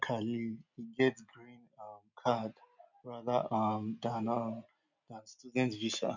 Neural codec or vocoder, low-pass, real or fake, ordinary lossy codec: none; 7.2 kHz; real; none